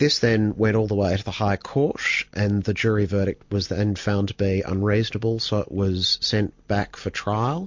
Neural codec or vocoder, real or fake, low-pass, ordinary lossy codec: none; real; 7.2 kHz; MP3, 48 kbps